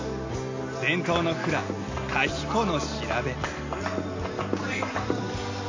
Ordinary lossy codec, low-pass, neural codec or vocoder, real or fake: AAC, 48 kbps; 7.2 kHz; none; real